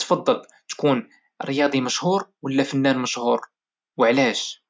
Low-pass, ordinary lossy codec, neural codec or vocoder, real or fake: none; none; none; real